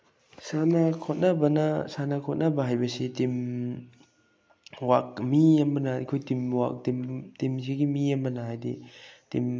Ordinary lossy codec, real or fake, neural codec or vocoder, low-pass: none; real; none; none